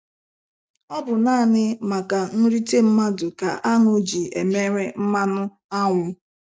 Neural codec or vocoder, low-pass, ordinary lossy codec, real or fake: none; none; none; real